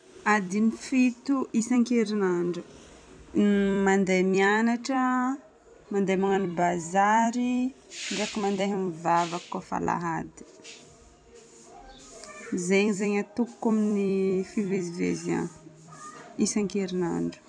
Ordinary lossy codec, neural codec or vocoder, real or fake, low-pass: none; vocoder, 44.1 kHz, 128 mel bands every 256 samples, BigVGAN v2; fake; 9.9 kHz